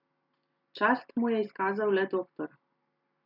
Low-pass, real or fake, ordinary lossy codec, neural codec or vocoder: 5.4 kHz; real; none; none